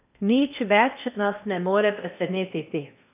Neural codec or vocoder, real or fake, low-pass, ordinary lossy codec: codec, 16 kHz in and 24 kHz out, 0.8 kbps, FocalCodec, streaming, 65536 codes; fake; 3.6 kHz; none